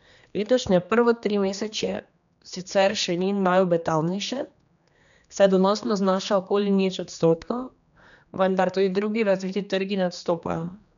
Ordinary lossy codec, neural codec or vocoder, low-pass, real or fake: none; codec, 16 kHz, 2 kbps, X-Codec, HuBERT features, trained on general audio; 7.2 kHz; fake